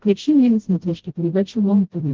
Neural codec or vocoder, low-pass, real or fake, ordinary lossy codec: codec, 16 kHz, 0.5 kbps, FreqCodec, smaller model; 7.2 kHz; fake; Opus, 16 kbps